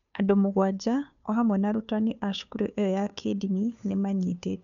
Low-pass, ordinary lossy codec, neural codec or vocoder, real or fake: 7.2 kHz; none; codec, 16 kHz, 2 kbps, FunCodec, trained on Chinese and English, 25 frames a second; fake